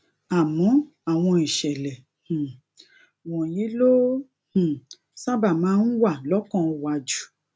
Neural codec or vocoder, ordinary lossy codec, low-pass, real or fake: none; none; none; real